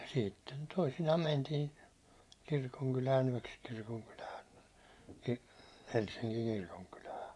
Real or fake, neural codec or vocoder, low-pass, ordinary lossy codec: fake; vocoder, 24 kHz, 100 mel bands, Vocos; none; none